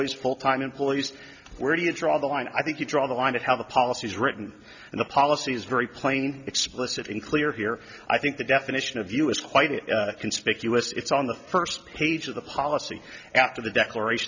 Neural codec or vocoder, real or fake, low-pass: none; real; 7.2 kHz